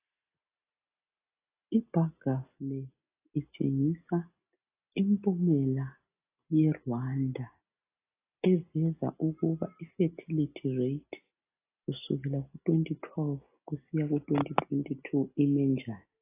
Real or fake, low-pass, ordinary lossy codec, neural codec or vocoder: real; 3.6 kHz; AAC, 24 kbps; none